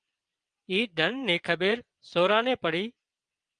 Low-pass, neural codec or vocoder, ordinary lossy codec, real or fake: 9.9 kHz; vocoder, 22.05 kHz, 80 mel bands, WaveNeXt; Opus, 32 kbps; fake